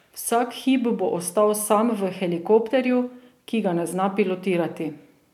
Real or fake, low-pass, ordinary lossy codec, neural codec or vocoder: real; 19.8 kHz; none; none